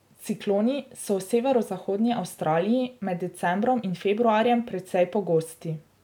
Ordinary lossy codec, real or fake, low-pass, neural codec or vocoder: none; real; 19.8 kHz; none